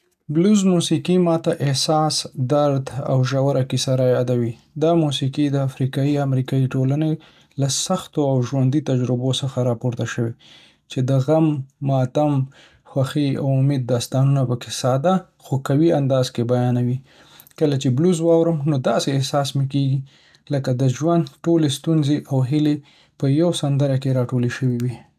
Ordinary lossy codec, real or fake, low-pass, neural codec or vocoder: none; real; 14.4 kHz; none